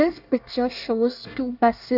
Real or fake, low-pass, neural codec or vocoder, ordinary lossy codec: fake; 5.4 kHz; codec, 24 kHz, 1 kbps, SNAC; none